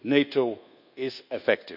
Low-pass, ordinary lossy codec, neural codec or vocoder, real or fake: 5.4 kHz; none; codec, 24 kHz, 1.2 kbps, DualCodec; fake